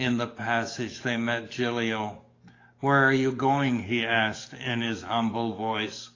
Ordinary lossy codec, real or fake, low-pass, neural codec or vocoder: AAC, 32 kbps; fake; 7.2 kHz; codec, 44.1 kHz, 7.8 kbps, DAC